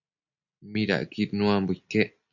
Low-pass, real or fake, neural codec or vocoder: 7.2 kHz; real; none